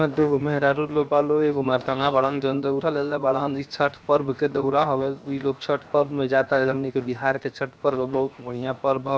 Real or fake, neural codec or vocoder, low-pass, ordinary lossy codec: fake; codec, 16 kHz, 0.7 kbps, FocalCodec; none; none